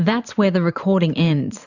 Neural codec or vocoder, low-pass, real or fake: vocoder, 44.1 kHz, 128 mel bands every 512 samples, BigVGAN v2; 7.2 kHz; fake